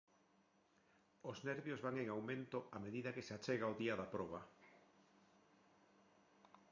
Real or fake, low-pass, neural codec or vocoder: real; 7.2 kHz; none